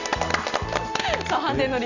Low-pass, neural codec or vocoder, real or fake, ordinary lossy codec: 7.2 kHz; none; real; none